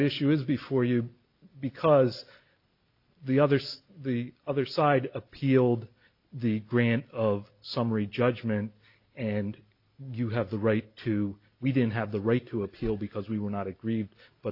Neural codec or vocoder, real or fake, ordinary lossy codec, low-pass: none; real; AAC, 48 kbps; 5.4 kHz